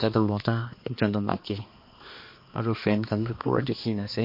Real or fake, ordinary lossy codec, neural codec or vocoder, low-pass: fake; MP3, 32 kbps; codec, 16 kHz, 2 kbps, X-Codec, HuBERT features, trained on balanced general audio; 5.4 kHz